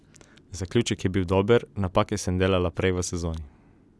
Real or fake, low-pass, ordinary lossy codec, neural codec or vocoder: real; none; none; none